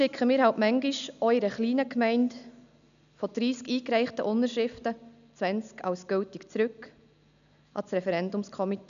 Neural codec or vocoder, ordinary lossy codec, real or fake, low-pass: none; none; real; 7.2 kHz